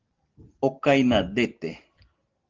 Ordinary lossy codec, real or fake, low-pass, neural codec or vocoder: Opus, 16 kbps; real; 7.2 kHz; none